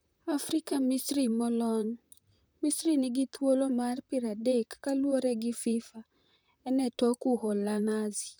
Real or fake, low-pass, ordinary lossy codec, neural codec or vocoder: fake; none; none; vocoder, 44.1 kHz, 128 mel bands, Pupu-Vocoder